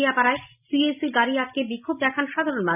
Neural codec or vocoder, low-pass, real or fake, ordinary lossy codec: none; 3.6 kHz; real; none